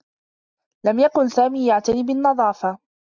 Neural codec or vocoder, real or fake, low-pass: none; real; 7.2 kHz